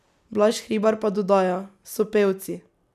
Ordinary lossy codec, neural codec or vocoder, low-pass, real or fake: none; none; 14.4 kHz; real